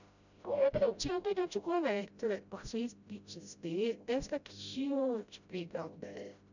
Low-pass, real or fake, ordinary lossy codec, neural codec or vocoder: 7.2 kHz; fake; none; codec, 16 kHz, 0.5 kbps, FreqCodec, smaller model